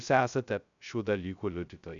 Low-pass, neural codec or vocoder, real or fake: 7.2 kHz; codec, 16 kHz, 0.2 kbps, FocalCodec; fake